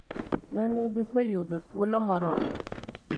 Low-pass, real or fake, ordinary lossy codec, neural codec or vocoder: 9.9 kHz; fake; none; codec, 44.1 kHz, 1.7 kbps, Pupu-Codec